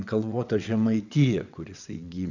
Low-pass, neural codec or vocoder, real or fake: 7.2 kHz; vocoder, 22.05 kHz, 80 mel bands, WaveNeXt; fake